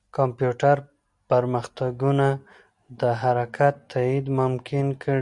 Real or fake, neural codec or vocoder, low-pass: real; none; 10.8 kHz